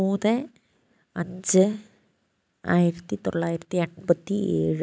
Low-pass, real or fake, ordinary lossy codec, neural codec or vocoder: none; real; none; none